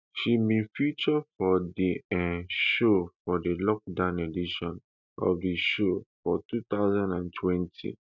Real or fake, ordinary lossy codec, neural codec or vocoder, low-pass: real; none; none; 7.2 kHz